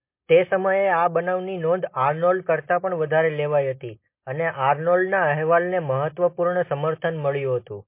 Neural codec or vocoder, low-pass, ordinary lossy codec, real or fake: none; 3.6 kHz; MP3, 24 kbps; real